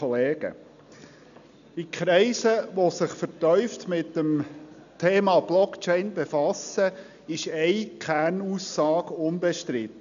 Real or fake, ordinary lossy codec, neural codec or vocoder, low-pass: real; none; none; 7.2 kHz